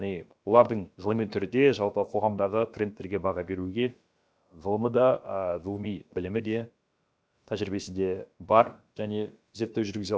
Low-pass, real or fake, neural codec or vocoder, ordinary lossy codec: none; fake; codec, 16 kHz, about 1 kbps, DyCAST, with the encoder's durations; none